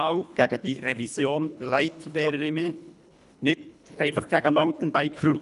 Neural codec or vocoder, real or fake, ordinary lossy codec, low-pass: codec, 24 kHz, 1.5 kbps, HILCodec; fake; none; 10.8 kHz